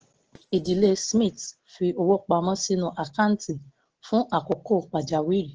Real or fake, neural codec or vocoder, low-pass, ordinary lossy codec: real; none; 7.2 kHz; Opus, 16 kbps